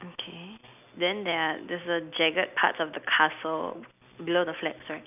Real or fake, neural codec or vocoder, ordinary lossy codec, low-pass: real; none; none; 3.6 kHz